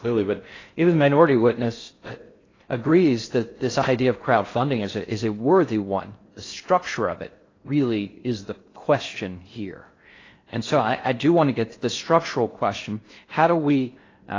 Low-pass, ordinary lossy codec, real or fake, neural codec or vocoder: 7.2 kHz; AAC, 32 kbps; fake; codec, 16 kHz in and 24 kHz out, 0.6 kbps, FocalCodec, streaming, 2048 codes